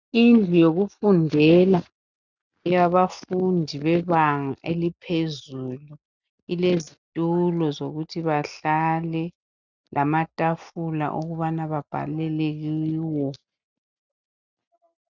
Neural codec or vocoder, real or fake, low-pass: none; real; 7.2 kHz